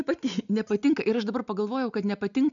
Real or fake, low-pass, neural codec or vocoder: real; 7.2 kHz; none